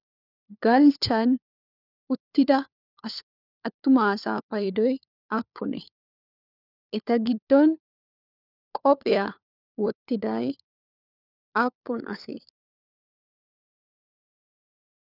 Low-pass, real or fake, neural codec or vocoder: 5.4 kHz; fake; codec, 16 kHz, 4 kbps, FunCodec, trained on LibriTTS, 50 frames a second